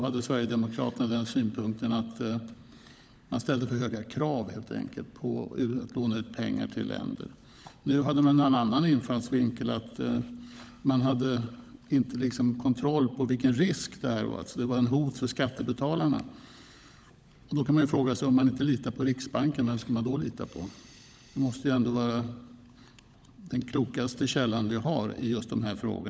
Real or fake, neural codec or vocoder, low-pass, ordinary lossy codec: fake; codec, 16 kHz, 16 kbps, FunCodec, trained on LibriTTS, 50 frames a second; none; none